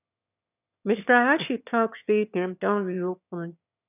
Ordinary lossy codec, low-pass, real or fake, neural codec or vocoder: none; 3.6 kHz; fake; autoencoder, 22.05 kHz, a latent of 192 numbers a frame, VITS, trained on one speaker